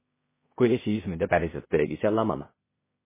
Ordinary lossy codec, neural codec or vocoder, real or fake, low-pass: MP3, 16 kbps; codec, 16 kHz in and 24 kHz out, 0.4 kbps, LongCat-Audio-Codec, two codebook decoder; fake; 3.6 kHz